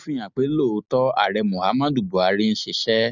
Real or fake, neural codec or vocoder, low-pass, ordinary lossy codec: real; none; 7.2 kHz; none